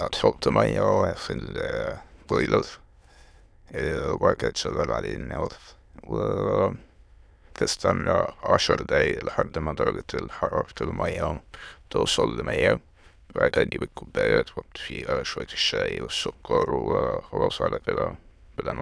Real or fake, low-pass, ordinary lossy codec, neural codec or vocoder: fake; none; none; autoencoder, 22.05 kHz, a latent of 192 numbers a frame, VITS, trained on many speakers